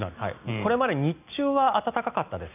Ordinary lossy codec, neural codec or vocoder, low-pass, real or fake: none; none; 3.6 kHz; real